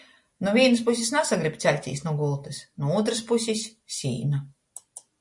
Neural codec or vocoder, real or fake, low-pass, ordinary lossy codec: none; real; 10.8 kHz; MP3, 48 kbps